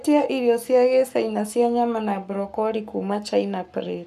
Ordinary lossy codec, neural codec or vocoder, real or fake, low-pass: none; codec, 44.1 kHz, 7.8 kbps, Pupu-Codec; fake; 14.4 kHz